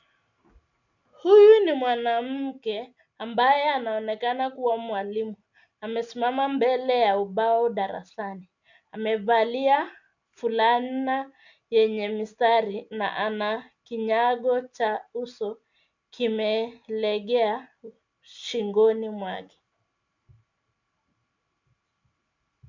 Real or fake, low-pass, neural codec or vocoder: real; 7.2 kHz; none